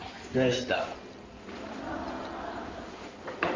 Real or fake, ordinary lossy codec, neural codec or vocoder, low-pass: fake; Opus, 32 kbps; codec, 16 kHz in and 24 kHz out, 2.2 kbps, FireRedTTS-2 codec; 7.2 kHz